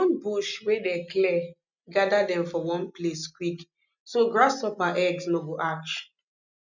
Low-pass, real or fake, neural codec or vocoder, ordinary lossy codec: 7.2 kHz; real; none; none